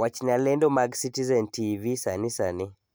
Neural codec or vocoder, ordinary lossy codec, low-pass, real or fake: none; none; none; real